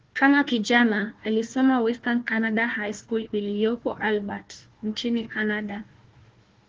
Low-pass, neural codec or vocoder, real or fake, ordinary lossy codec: 7.2 kHz; codec, 16 kHz, 1 kbps, FunCodec, trained on Chinese and English, 50 frames a second; fake; Opus, 16 kbps